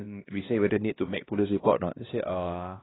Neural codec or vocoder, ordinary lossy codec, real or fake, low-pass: codec, 16 kHz, 1 kbps, X-Codec, HuBERT features, trained on LibriSpeech; AAC, 16 kbps; fake; 7.2 kHz